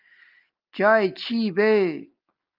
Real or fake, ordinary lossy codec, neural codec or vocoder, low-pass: real; Opus, 24 kbps; none; 5.4 kHz